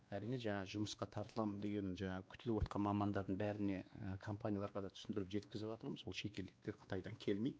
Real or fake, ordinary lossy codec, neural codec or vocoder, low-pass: fake; none; codec, 16 kHz, 2 kbps, X-Codec, WavLM features, trained on Multilingual LibriSpeech; none